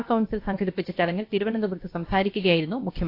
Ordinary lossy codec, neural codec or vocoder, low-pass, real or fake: AAC, 32 kbps; codec, 16 kHz, about 1 kbps, DyCAST, with the encoder's durations; 5.4 kHz; fake